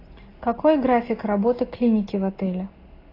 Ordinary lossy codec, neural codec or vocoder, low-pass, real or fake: AAC, 24 kbps; none; 5.4 kHz; real